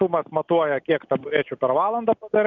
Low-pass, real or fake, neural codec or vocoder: 7.2 kHz; real; none